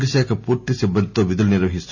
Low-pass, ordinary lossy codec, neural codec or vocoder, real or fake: 7.2 kHz; none; none; real